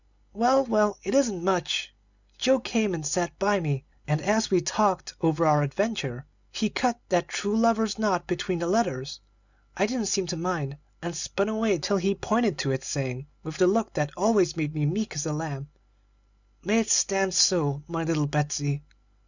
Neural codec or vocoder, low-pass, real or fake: none; 7.2 kHz; real